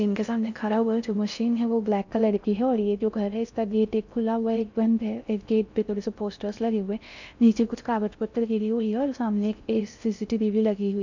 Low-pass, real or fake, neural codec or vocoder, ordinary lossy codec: 7.2 kHz; fake; codec, 16 kHz in and 24 kHz out, 0.6 kbps, FocalCodec, streaming, 4096 codes; none